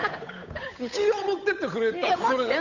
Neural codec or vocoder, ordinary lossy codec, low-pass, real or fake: codec, 16 kHz, 8 kbps, FunCodec, trained on Chinese and English, 25 frames a second; none; 7.2 kHz; fake